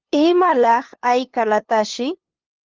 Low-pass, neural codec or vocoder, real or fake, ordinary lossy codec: 7.2 kHz; codec, 16 kHz, 2 kbps, FunCodec, trained on LibriTTS, 25 frames a second; fake; Opus, 16 kbps